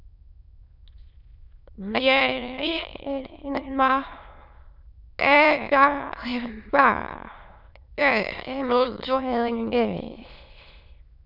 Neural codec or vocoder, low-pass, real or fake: autoencoder, 22.05 kHz, a latent of 192 numbers a frame, VITS, trained on many speakers; 5.4 kHz; fake